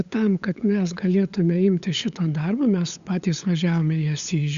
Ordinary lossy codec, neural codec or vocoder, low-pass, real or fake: Opus, 64 kbps; codec, 16 kHz, 8 kbps, FunCodec, trained on LibriTTS, 25 frames a second; 7.2 kHz; fake